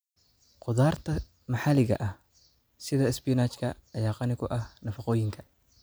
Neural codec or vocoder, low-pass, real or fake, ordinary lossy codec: none; none; real; none